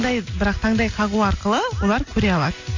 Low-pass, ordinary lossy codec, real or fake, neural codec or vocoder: 7.2 kHz; AAC, 48 kbps; real; none